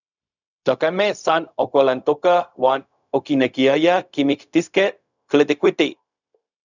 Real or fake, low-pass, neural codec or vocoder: fake; 7.2 kHz; codec, 16 kHz, 0.4 kbps, LongCat-Audio-Codec